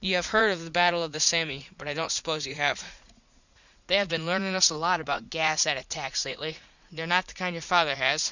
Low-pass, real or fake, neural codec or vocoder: 7.2 kHz; fake; vocoder, 44.1 kHz, 80 mel bands, Vocos